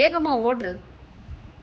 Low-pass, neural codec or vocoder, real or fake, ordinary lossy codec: none; codec, 16 kHz, 2 kbps, X-Codec, HuBERT features, trained on general audio; fake; none